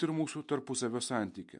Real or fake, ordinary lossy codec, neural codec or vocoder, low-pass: real; MP3, 64 kbps; none; 10.8 kHz